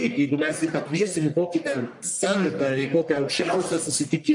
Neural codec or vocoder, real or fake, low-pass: codec, 44.1 kHz, 1.7 kbps, Pupu-Codec; fake; 10.8 kHz